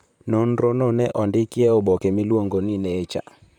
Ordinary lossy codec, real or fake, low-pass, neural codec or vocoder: none; fake; 19.8 kHz; vocoder, 44.1 kHz, 128 mel bands, Pupu-Vocoder